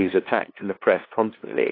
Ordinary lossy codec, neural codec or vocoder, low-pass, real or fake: AAC, 24 kbps; codec, 16 kHz, 2 kbps, FunCodec, trained on LibriTTS, 25 frames a second; 5.4 kHz; fake